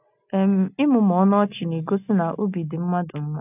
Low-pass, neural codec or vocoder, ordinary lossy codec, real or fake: 3.6 kHz; none; none; real